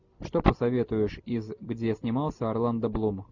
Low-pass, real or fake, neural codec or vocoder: 7.2 kHz; real; none